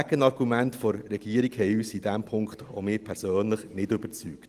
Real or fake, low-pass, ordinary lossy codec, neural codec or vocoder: real; 14.4 kHz; Opus, 24 kbps; none